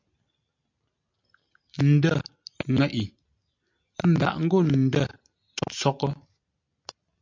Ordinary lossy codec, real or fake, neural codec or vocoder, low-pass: MP3, 64 kbps; real; none; 7.2 kHz